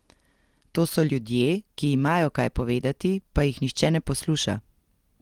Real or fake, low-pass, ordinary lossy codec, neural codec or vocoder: real; 19.8 kHz; Opus, 24 kbps; none